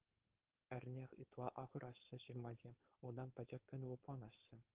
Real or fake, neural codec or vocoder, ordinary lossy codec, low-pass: fake; codec, 16 kHz, 4.8 kbps, FACodec; Opus, 16 kbps; 3.6 kHz